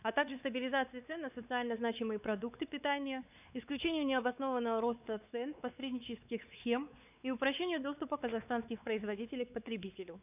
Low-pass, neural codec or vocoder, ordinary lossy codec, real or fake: 3.6 kHz; codec, 16 kHz, 4 kbps, X-Codec, WavLM features, trained on Multilingual LibriSpeech; none; fake